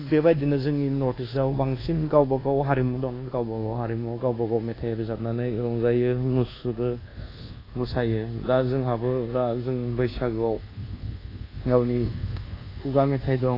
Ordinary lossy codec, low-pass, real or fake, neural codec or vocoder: AAC, 24 kbps; 5.4 kHz; fake; codec, 24 kHz, 1.2 kbps, DualCodec